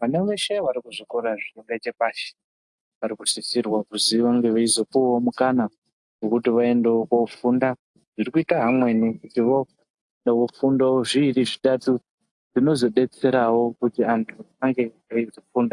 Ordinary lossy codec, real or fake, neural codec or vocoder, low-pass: AAC, 64 kbps; real; none; 10.8 kHz